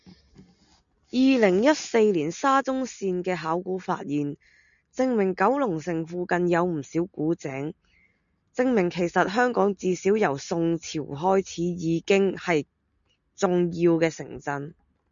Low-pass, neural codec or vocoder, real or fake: 7.2 kHz; none; real